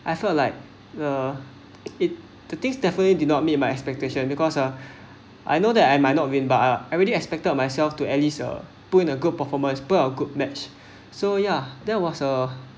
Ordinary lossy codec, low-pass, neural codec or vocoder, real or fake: none; none; none; real